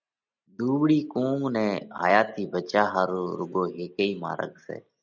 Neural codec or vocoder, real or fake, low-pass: none; real; 7.2 kHz